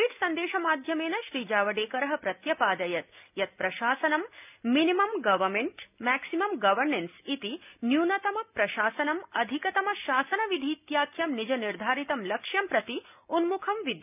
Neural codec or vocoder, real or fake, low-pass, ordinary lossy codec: none; real; 3.6 kHz; none